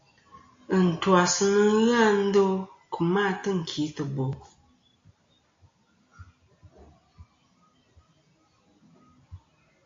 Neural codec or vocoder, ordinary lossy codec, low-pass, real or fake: none; AAC, 48 kbps; 7.2 kHz; real